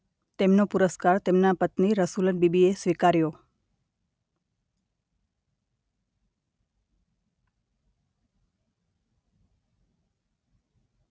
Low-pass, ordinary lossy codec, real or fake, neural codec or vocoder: none; none; real; none